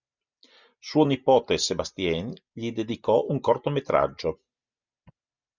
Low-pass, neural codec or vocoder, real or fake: 7.2 kHz; none; real